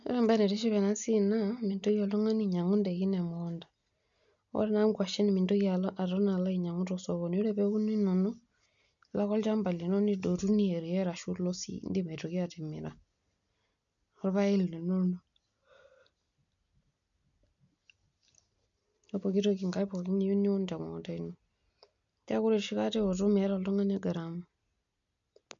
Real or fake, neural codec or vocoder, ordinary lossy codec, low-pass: real; none; none; 7.2 kHz